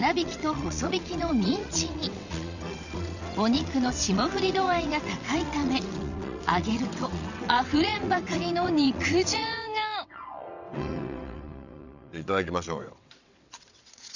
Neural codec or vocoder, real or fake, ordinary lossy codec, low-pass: vocoder, 22.05 kHz, 80 mel bands, WaveNeXt; fake; none; 7.2 kHz